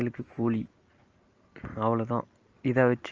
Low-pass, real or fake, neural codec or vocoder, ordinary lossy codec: 7.2 kHz; real; none; Opus, 24 kbps